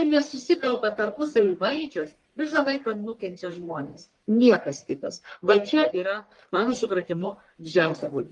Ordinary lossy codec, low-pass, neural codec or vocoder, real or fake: Opus, 32 kbps; 10.8 kHz; codec, 44.1 kHz, 1.7 kbps, Pupu-Codec; fake